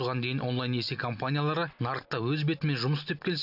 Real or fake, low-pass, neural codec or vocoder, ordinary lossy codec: real; 5.4 kHz; none; none